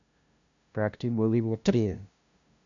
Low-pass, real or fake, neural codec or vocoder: 7.2 kHz; fake; codec, 16 kHz, 0.5 kbps, FunCodec, trained on LibriTTS, 25 frames a second